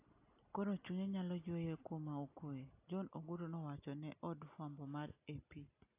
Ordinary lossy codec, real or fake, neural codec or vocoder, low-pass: AAC, 24 kbps; real; none; 3.6 kHz